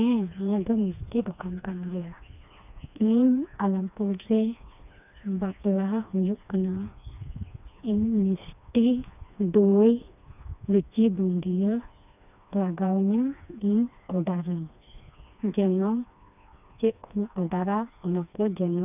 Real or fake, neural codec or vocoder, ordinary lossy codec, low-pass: fake; codec, 16 kHz, 2 kbps, FreqCodec, smaller model; none; 3.6 kHz